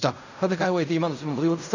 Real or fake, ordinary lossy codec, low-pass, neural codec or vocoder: fake; none; 7.2 kHz; codec, 16 kHz in and 24 kHz out, 0.4 kbps, LongCat-Audio-Codec, fine tuned four codebook decoder